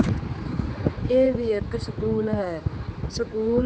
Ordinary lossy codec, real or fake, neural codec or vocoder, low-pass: none; fake; codec, 16 kHz, 4 kbps, X-Codec, HuBERT features, trained on general audio; none